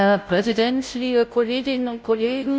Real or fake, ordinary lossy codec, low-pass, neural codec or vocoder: fake; none; none; codec, 16 kHz, 0.5 kbps, FunCodec, trained on Chinese and English, 25 frames a second